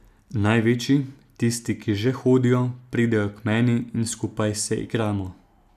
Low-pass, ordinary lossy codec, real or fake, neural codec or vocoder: 14.4 kHz; none; real; none